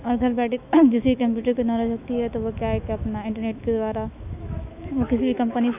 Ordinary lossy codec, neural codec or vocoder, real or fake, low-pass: none; autoencoder, 48 kHz, 128 numbers a frame, DAC-VAE, trained on Japanese speech; fake; 3.6 kHz